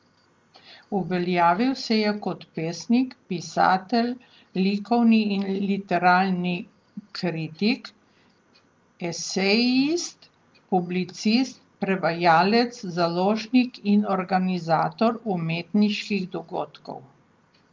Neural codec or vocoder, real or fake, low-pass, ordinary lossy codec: none; real; 7.2 kHz; Opus, 32 kbps